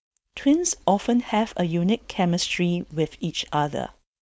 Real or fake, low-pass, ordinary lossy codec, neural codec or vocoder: fake; none; none; codec, 16 kHz, 4.8 kbps, FACodec